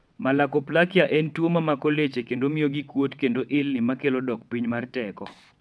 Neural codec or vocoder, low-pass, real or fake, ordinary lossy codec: vocoder, 22.05 kHz, 80 mel bands, WaveNeXt; none; fake; none